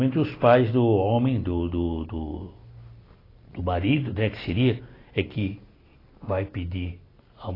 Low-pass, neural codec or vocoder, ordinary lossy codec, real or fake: 5.4 kHz; none; AAC, 24 kbps; real